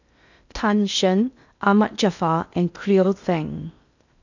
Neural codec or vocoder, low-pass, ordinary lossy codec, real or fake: codec, 16 kHz in and 24 kHz out, 0.6 kbps, FocalCodec, streaming, 2048 codes; 7.2 kHz; none; fake